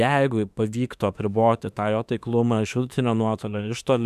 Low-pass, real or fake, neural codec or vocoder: 14.4 kHz; fake; autoencoder, 48 kHz, 32 numbers a frame, DAC-VAE, trained on Japanese speech